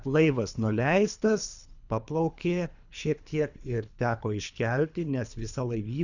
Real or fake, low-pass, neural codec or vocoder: fake; 7.2 kHz; codec, 24 kHz, 3 kbps, HILCodec